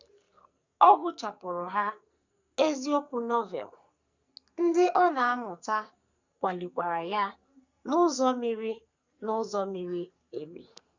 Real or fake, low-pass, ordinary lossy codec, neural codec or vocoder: fake; 7.2 kHz; Opus, 64 kbps; codec, 44.1 kHz, 2.6 kbps, SNAC